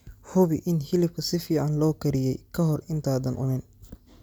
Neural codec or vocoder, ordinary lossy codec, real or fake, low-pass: none; none; real; none